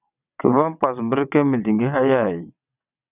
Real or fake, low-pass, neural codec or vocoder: fake; 3.6 kHz; vocoder, 22.05 kHz, 80 mel bands, WaveNeXt